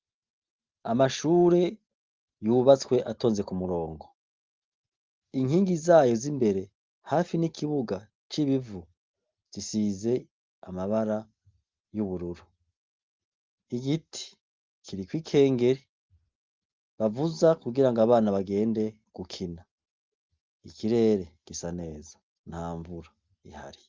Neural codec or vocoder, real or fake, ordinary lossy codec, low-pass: none; real; Opus, 32 kbps; 7.2 kHz